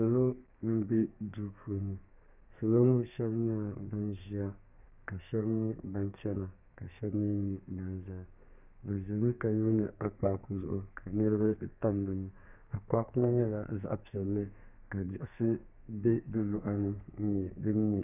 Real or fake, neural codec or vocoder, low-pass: fake; codec, 32 kHz, 1.9 kbps, SNAC; 3.6 kHz